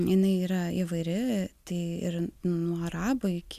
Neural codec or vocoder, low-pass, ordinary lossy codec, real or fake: none; 14.4 kHz; MP3, 96 kbps; real